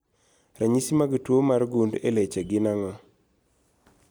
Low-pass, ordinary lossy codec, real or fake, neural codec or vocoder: none; none; real; none